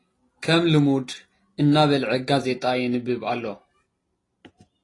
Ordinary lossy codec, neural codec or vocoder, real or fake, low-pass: AAC, 32 kbps; none; real; 10.8 kHz